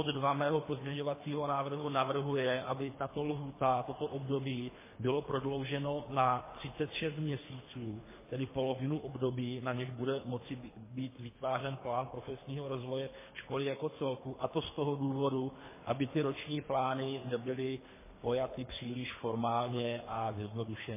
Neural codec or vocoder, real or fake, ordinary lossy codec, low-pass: codec, 24 kHz, 3 kbps, HILCodec; fake; MP3, 16 kbps; 3.6 kHz